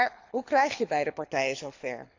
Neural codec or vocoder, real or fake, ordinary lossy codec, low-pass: codec, 24 kHz, 6 kbps, HILCodec; fake; AAC, 48 kbps; 7.2 kHz